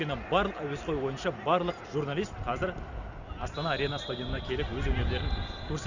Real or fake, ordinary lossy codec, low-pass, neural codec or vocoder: real; none; 7.2 kHz; none